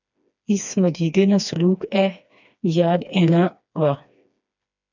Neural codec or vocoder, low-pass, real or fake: codec, 16 kHz, 2 kbps, FreqCodec, smaller model; 7.2 kHz; fake